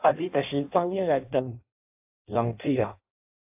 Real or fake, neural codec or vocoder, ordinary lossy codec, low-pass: fake; codec, 16 kHz in and 24 kHz out, 0.6 kbps, FireRedTTS-2 codec; AAC, 32 kbps; 3.6 kHz